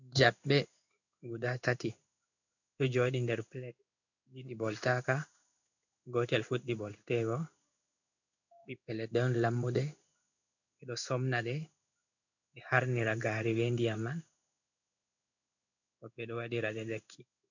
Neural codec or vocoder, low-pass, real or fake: codec, 16 kHz in and 24 kHz out, 1 kbps, XY-Tokenizer; 7.2 kHz; fake